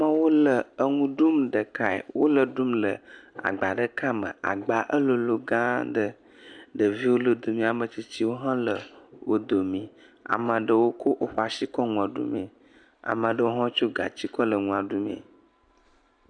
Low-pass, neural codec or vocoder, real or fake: 9.9 kHz; none; real